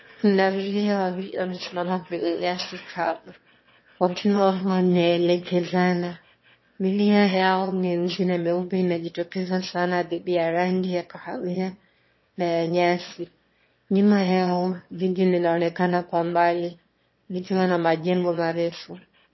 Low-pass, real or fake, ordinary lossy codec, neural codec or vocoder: 7.2 kHz; fake; MP3, 24 kbps; autoencoder, 22.05 kHz, a latent of 192 numbers a frame, VITS, trained on one speaker